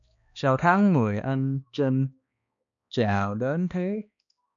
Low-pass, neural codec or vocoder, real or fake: 7.2 kHz; codec, 16 kHz, 2 kbps, X-Codec, HuBERT features, trained on balanced general audio; fake